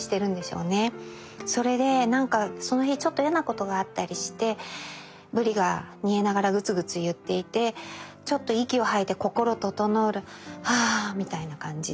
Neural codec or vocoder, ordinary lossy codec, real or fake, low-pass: none; none; real; none